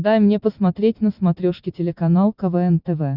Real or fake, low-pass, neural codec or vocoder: real; 5.4 kHz; none